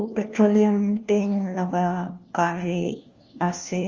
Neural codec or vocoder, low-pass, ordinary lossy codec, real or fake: codec, 16 kHz, 1 kbps, FunCodec, trained on LibriTTS, 50 frames a second; 7.2 kHz; Opus, 32 kbps; fake